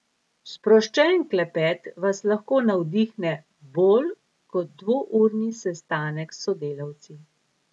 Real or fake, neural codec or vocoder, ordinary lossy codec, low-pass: real; none; none; none